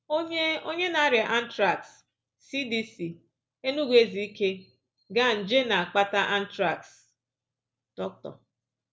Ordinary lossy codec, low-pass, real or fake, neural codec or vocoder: none; none; real; none